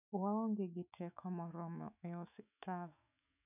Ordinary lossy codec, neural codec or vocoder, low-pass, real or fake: none; autoencoder, 48 kHz, 128 numbers a frame, DAC-VAE, trained on Japanese speech; 3.6 kHz; fake